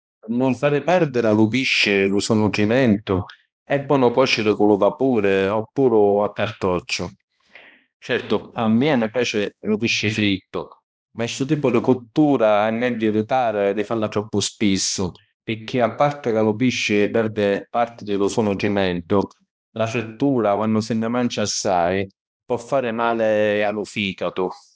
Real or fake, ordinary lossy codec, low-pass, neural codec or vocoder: fake; none; none; codec, 16 kHz, 1 kbps, X-Codec, HuBERT features, trained on balanced general audio